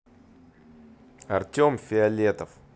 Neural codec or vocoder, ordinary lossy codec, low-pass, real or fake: none; none; none; real